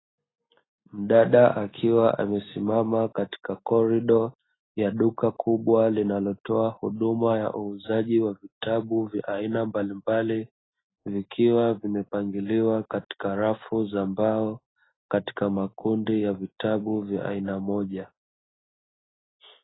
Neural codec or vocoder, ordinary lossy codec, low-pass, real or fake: none; AAC, 16 kbps; 7.2 kHz; real